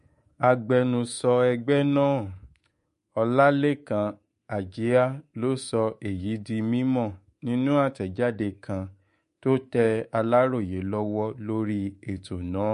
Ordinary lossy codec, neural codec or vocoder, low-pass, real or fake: MP3, 48 kbps; codec, 24 kHz, 3.1 kbps, DualCodec; 10.8 kHz; fake